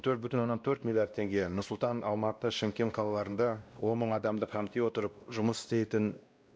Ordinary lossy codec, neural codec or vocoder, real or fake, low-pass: none; codec, 16 kHz, 1 kbps, X-Codec, WavLM features, trained on Multilingual LibriSpeech; fake; none